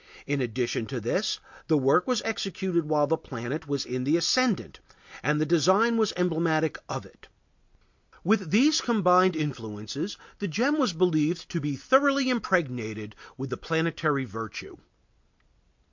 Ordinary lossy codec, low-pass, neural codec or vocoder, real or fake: MP3, 48 kbps; 7.2 kHz; none; real